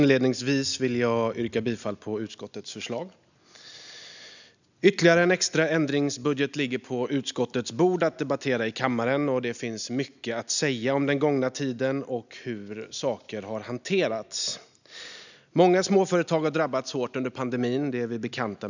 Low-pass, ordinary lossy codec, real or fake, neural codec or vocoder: 7.2 kHz; none; real; none